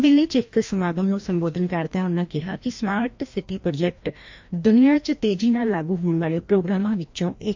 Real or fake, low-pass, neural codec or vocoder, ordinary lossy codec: fake; 7.2 kHz; codec, 16 kHz, 1 kbps, FreqCodec, larger model; MP3, 48 kbps